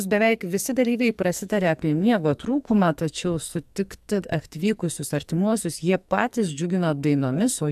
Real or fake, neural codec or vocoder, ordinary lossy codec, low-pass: fake; codec, 44.1 kHz, 2.6 kbps, SNAC; AAC, 96 kbps; 14.4 kHz